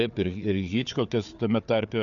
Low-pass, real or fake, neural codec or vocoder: 7.2 kHz; fake; codec, 16 kHz, 4 kbps, FunCodec, trained on Chinese and English, 50 frames a second